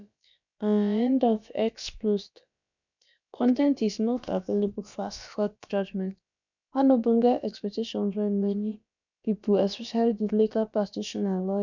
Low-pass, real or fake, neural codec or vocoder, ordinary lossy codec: 7.2 kHz; fake; codec, 16 kHz, about 1 kbps, DyCAST, with the encoder's durations; none